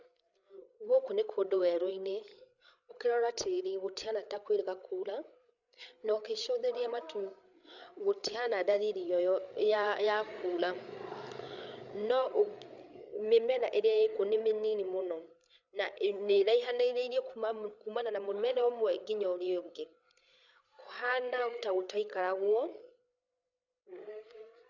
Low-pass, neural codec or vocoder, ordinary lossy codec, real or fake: 7.2 kHz; codec, 16 kHz, 8 kbps, FreqCodec, larger model; none; fake